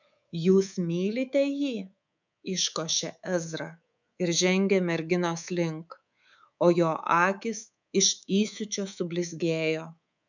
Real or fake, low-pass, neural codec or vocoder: fake; 7.2 kHz; codec, 24 kHz, 3.1 kbps, DualCodec